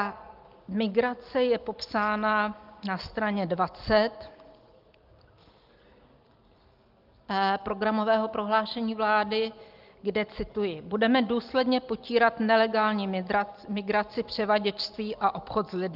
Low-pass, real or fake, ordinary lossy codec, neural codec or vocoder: 5.4 kHz; real; Opus, 24 kbps; none